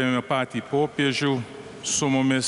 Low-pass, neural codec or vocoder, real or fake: 10.8 kHz; none; real